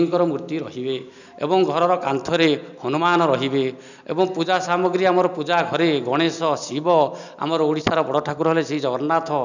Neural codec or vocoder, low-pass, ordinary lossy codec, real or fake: none; 7.2 kHz; none; real